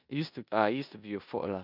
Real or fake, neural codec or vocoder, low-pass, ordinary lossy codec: fake; codec, 16 kHz in and 24 kHz out, 0.9 kbps, LongCat-Audio-Codec, four codebook decoder; 5.4 kHz; none